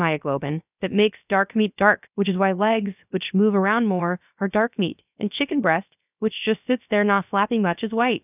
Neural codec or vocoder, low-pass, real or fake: codec, 16 kHz, about 1 kbps, DyCAST, with the encoder's durations; 3.6 kHz; fake